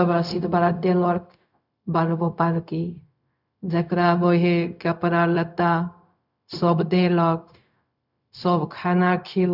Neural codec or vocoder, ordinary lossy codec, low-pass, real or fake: codec, 16 kHz, 0.4 kbps, LongCat-Audio-Codec; none; 5.4 kHz; fake